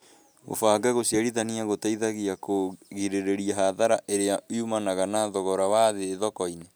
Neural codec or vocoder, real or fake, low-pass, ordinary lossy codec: none; real; none; none